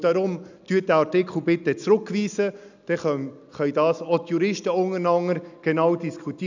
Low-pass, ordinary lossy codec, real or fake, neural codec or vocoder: 7.2 kHz; none; real; none